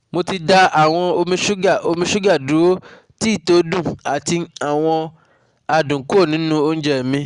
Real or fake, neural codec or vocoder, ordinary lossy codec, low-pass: real; none; Opus, 64 kbps; 9.9 kHz